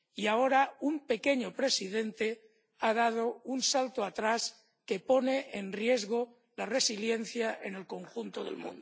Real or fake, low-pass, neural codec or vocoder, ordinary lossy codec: real; none; none; none